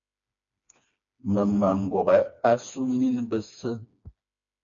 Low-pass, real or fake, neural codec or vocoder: 7.2 kHz; fake; codec, 16 kHz, 2 kbps, FreqCodec, smaller model